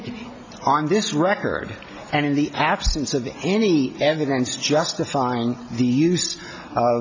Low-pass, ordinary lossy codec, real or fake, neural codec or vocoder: 7.2 kHz; AAC, 48 kbps; real; none